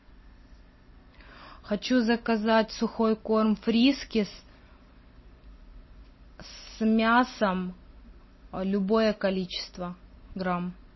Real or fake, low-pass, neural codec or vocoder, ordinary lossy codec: real; 7.2 kHz; none; MP3, 24 kbps